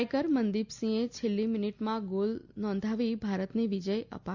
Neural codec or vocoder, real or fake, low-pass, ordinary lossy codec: none; real; 7.2 kHz; Opus, 64 kbps